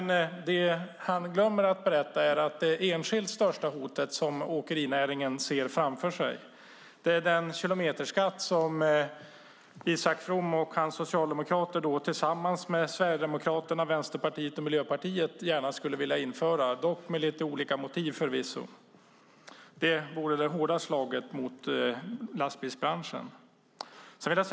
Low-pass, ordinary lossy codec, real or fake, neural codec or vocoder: none; none; real; none